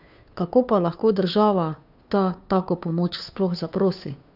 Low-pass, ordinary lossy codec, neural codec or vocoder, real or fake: 5.4 kHz; none; codec, 16 kHz, 2 kbps, FunCodec, trained on Chinese and English, 25 frames a second; fake